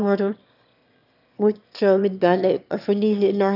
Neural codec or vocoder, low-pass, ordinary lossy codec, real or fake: autoencoder, 22.05 kHz, a latent of 192 numbers a frame, VITS, trained on one speaker; 5.4 kHz; none; fake